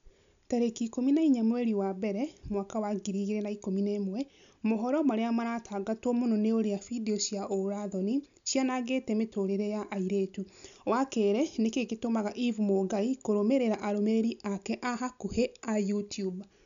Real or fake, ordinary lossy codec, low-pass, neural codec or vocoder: real; none; 7.2 kHz; none